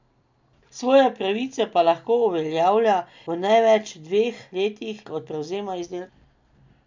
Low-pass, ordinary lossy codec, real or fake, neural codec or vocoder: 7.2 kHz; MP3, 64 kbps; real; none